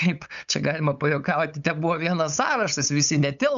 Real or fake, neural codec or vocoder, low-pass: fake; codec, 16 kHz, 8 kbps, FunCodec, trained on LibriTTS, 25 frames a second; 7.2 kHz